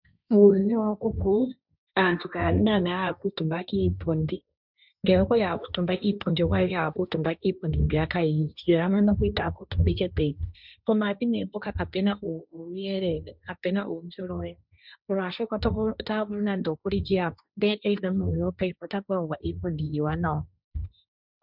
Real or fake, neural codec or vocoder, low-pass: fake; codec, 16 kHz, 1.1 kbps, Voila-Tokenizer; 5.4 kHz